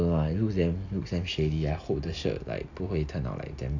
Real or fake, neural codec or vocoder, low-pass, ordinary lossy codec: real; none; 7.2 kHz; none